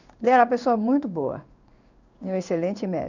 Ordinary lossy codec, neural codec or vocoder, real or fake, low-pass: none; codec, 16 kHz in and 24 kHz out, 1 kbps, XY-Tokenizer; fake; 7.2 kHz